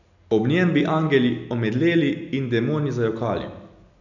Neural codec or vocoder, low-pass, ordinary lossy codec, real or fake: none; 7.2 kHz; none; real